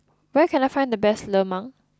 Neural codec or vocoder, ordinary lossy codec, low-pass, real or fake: none; none; none; real